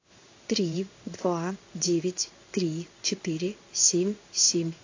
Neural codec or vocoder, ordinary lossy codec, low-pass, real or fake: codec, 16 kHz in and 24 kHz out, 1 kbps, XY-Tokenizer; AAC, 48 kbps; 7.2 kHz; fake